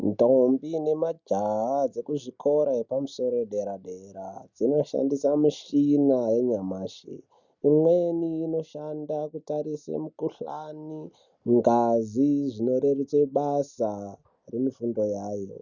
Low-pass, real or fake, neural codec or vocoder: 7.2 kHz; real; none